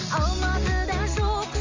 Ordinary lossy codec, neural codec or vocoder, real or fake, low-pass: AAC, 48 kbps; none; real; 7.2 kHz